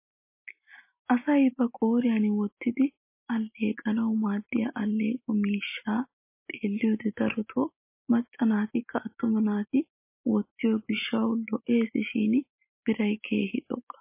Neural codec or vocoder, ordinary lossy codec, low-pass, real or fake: none; MP3, 24 kbps; 3.6 kHz; real